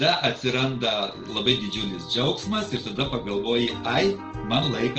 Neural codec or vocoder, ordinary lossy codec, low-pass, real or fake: none; Opus, 16 kbps; 7.2 kHz; real